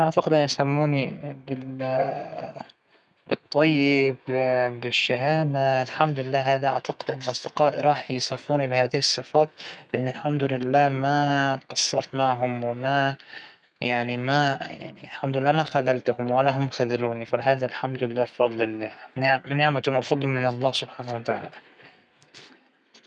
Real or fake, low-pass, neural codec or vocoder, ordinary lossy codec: fake; 9.9 kHz; codec, 32 kHz, 1.9 kbps, SNAC; none